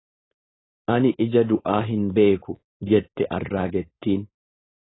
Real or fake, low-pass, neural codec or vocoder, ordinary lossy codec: fake; 7.2 kHz; codec, 16 kHz, 4.8 kbps, FACodec; AAC, 16 kbps